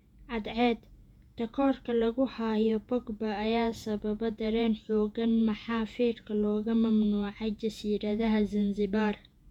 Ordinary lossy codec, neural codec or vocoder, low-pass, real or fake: none; vocoder, 48 kHz, 128 mel bands, Vocos; 19.8 kHz; fake